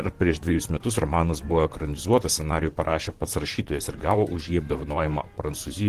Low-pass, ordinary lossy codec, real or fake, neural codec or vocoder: 14.4 kHz; Opus, 16 kbps; fake; vocoder, 44.1 kHz, 128 mel bands, Pupu-Vocoder